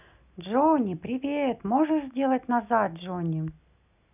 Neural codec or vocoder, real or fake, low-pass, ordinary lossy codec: none; real; 3.6 kHz; none